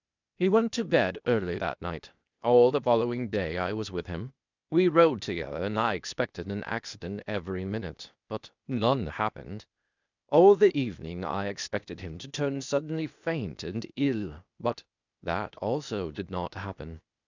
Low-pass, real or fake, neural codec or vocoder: 7.2 kHz; fake; codec, 16 kHz, 0.8 kbps, ZipCodec